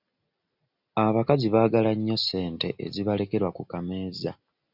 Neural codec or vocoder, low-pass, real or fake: none; 5.4 kHz; real